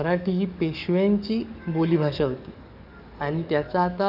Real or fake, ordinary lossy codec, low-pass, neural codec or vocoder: fake; none; 5.4 kHz; codec, 44.1 kHz, 7.8 kbps, DAC